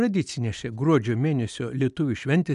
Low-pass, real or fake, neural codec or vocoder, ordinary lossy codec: 10.8 kHz; real; none; MP3, 96 kbps